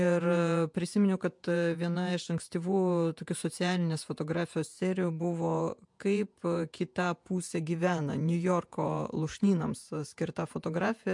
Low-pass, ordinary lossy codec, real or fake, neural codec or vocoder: 10.8 kHz; MP3, 64 kbps; fake; vocoder, 48 kHz, 128 mel bands, Vocos